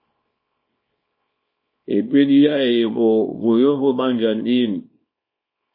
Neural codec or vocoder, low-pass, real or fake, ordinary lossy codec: codec, 24 kHz, 0.9 kbps, WavTokenizer, small release; 5.4 kHz; fake; MP3, 24 kbps